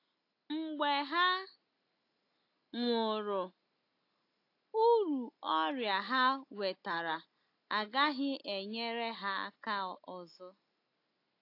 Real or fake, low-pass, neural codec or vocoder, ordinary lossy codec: real; 5.4 kHz; none; AAC, 32 kbps